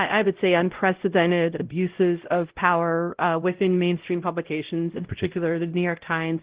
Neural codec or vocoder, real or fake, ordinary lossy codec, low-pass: codec, 16 kHz, 0.5 kbps, X-Codec, WavLM features, trained on Multilingual LibriSpeech; fake; Opus, 16 kbps; 3.6 kHz